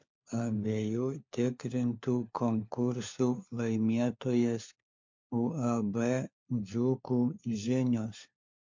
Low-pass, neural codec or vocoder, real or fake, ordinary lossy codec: 7.2 kHz; codec, 16 kHz, 4 kbps, FunCodec, trained on LibriTTS, 50 frames a second; fake; MP3, 48 kbps